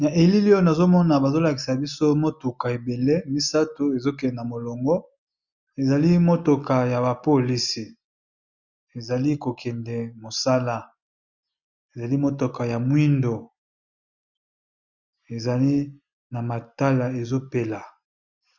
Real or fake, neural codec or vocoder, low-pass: real; none; 7.2 kHz